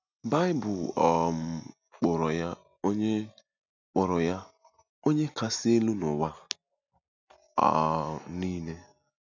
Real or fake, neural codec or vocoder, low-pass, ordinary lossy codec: real; none; 7.2 kHz; none